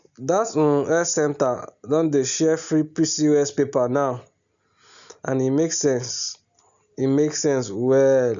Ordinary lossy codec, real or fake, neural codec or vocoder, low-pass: none; real; none; 7.2 kHz